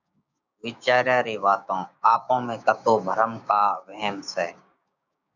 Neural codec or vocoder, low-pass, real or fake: codec, 16 kHz, 6 kbps, DAC; 7.2 kHz; fake